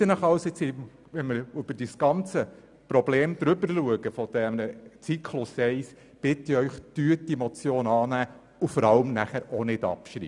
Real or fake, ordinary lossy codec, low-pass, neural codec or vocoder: real; none; 10.8 kHz; none